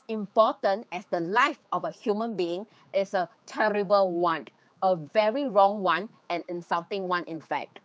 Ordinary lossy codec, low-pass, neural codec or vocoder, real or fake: none; none; codec, 16 kHz, 4 kbps, X-Codec, HuBERT features, trained on general audio; fake